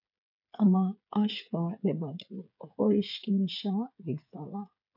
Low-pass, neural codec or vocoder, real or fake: 5.4 kHz; codec, 16 kHz, 8 kbps, FreqCodec, smaller model; fake